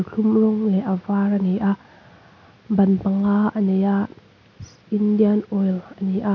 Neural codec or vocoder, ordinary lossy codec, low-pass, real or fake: none; none; 7.2 kHz; real